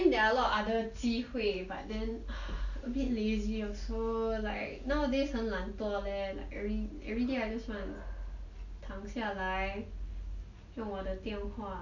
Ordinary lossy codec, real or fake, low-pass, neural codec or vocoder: none; real; 7.2 kHz; none